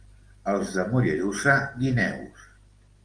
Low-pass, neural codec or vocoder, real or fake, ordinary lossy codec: 9.9 kHz; none; real; Opus, 24 kbps